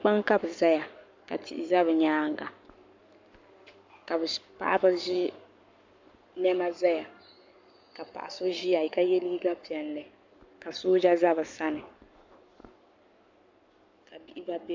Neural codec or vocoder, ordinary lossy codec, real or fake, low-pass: codec, 44.1 kHz, 7.8 kbps, Pupu-Codec; MP3, 64 kbps; fake; 7.2 kHz